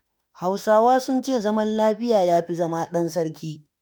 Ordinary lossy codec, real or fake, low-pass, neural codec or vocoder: none; fake; none; autoencoder, 48 kHz, 32 numbers a frame, DAC-VAE, trained on Japanese speech